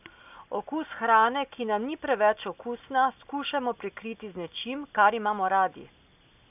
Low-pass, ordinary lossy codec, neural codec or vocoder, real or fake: 3.6 kHz; none; none; real